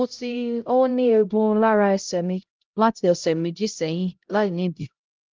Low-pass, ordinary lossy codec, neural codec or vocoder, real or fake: 7.2 kHz; Opus, 32 kbps; codec, 16 kHz, 0.5 kbps, X-Codec, HuBERT features, trained on LibriSpeech; fake